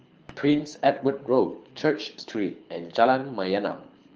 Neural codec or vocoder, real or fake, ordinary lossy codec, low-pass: codec, 24 kHz, 6 kbps, HILCodec; fake; Opus, 24 kbps; 7.2 kHz